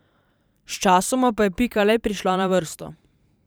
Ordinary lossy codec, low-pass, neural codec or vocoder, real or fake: none; none; vocoder, 44.1 kHz, 128 mel bands every 512 samples, BigVGAN v2; fake